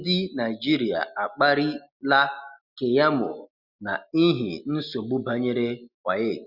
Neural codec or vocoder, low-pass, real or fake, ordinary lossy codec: none; 5.4 kHz; real; Opus, 64 kbps